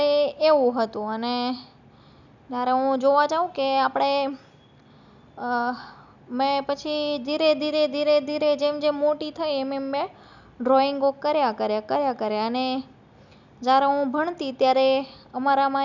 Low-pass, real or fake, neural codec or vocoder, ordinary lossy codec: 7.2 kHz; real; none; none